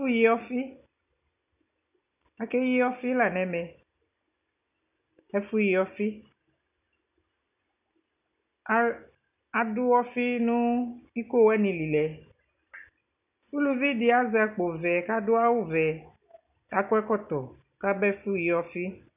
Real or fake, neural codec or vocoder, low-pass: real; none; 3.6 kHz